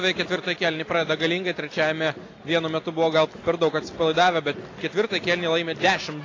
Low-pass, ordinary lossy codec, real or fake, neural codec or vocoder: 7.2 kHz; AAC, 32 kbps; real; none